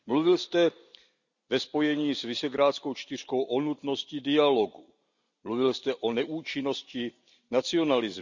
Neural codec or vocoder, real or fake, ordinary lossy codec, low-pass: none; real; none; 7.2 kHz